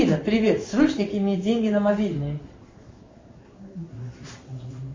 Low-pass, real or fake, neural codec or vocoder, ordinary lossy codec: 7.2 kHz; fake; codec, 16 kHz in and 24 kHz out, 1 kbps, XY-Tokenizer; MP3, 32 kbps